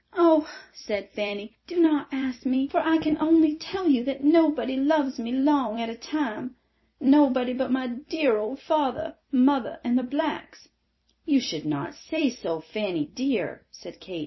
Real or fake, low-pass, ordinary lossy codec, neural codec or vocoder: fake; 7.2 kHz; MP3, 24 kbps; vocoder, 44.1 kHz, 128 mel bands every 256 samples, BigVGAN v2